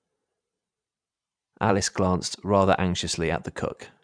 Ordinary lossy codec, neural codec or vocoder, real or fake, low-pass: none; vocoder, 44.1 kHz, 128 mel bands every 512 samples, BigVGAN v2; fake; 9.9 kHz